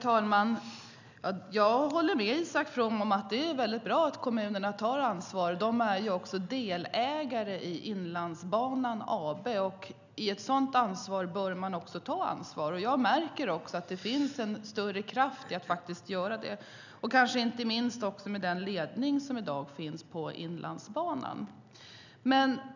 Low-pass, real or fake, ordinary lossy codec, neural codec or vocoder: 7.2 kHz; real; none; none